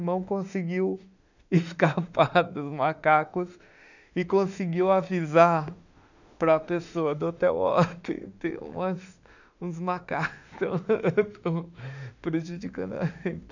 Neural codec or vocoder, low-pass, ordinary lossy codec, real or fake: autoencoder, 48 kHz, 32 numbers a frame, DAC-VAE, trained on Japanese speech; 7.2 kHz; none; fake